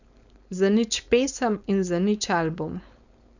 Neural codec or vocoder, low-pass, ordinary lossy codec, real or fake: codec, 16 kHz, 4.8 kbps, FACodec; 7.2 kHz; none; fake